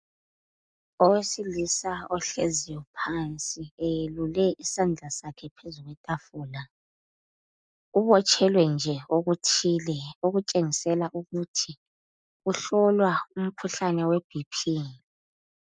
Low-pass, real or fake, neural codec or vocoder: 9.9 kHz; real; none